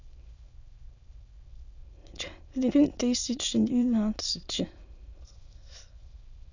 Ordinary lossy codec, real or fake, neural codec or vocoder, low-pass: none; fake; autoencoder, 22.05 kHz, a latent of 192 numbers a frame, VITS, trained on many speakers; 7.2 kHz